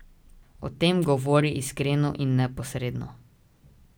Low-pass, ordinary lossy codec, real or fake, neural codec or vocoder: none; none; real; none